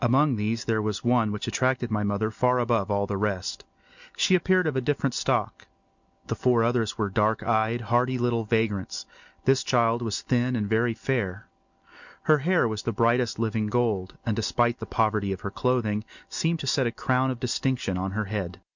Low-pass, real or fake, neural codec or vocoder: 7.2 kHz; real; none